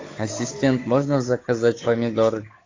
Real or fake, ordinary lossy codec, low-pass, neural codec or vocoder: fake; AAC, 32 kbps; 7.2 kHz; codec, 16 kHz, 8 kbps, FunCodec, trained on Chinese and English, 25 frames a second